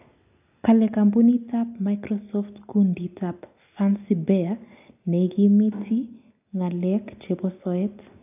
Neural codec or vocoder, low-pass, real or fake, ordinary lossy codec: none; 3.6 kHz; real; AAC, 32 kbps